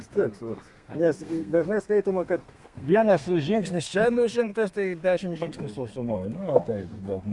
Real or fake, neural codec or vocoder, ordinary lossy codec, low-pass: fake; codec, 32 kHz, 1.9 kbps, SNAC; Opus, 64 kbps; 10.8 kHz